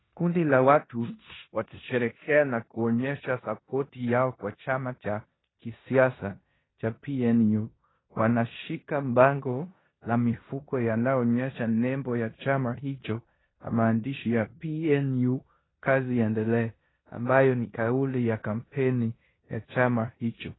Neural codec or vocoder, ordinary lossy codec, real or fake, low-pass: codec, 16 kHz in and 24 kHz out, 0.9 kbps, LongCat-Audio-Codec, four codebook decoder; AAC, 16 kbps; fake; 7.2 kHz